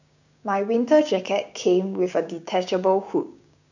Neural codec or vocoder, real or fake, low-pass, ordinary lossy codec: codec, 16 kHz, 6 kbps, DAC; fake; 7.2 kHz; none